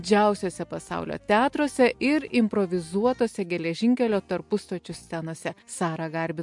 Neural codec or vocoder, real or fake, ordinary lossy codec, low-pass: none; real; MP3, 64 kbps; 10.8 kHz